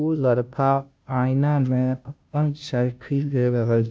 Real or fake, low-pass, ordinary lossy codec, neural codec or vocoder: fake; none; none; codec, 16 kHz, 0.5 kbps, FunCodec, trained on Chinese and English, 25 frames a second